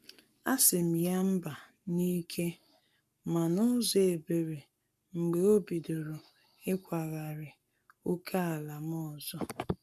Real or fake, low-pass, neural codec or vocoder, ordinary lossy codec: fake; 14.4 kHz; codec, 44.1 kHz, 7.8 kbps, Pupu-Codec; none